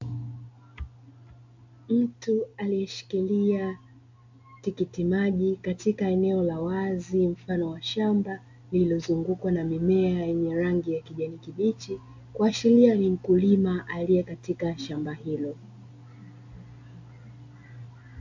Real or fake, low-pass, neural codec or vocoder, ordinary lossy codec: real; 7.2 kHz; none; MP3, 64 kbps